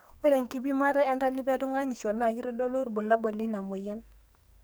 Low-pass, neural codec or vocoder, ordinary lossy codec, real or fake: none; codec, 44.1 kHz, 2.6 kbps, SNAC; none; fake